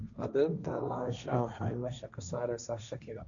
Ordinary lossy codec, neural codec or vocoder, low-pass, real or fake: none; codec, 16 kHz, 1.1 kbps, Voila-Tokenizer; 7.2 kHz; fake